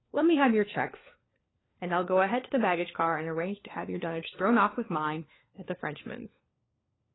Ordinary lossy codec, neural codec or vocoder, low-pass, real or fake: AAC, 16 kbps; codec, 16 kHz, 4 kbps, FunCodec, trained on LibriTTS, 50 frames a second; 7.2 kHz; fake